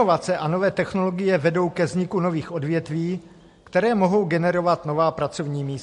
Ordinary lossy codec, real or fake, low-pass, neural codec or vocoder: MP3, 48 kbps; real; 14.4 kHz; none